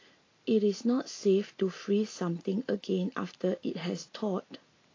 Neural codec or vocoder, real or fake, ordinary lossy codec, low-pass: none; real; AAC, 32 kbps; 7.2 kHz